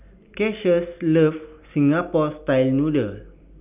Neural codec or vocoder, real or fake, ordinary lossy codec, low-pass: none; real; none; 3.6 kHz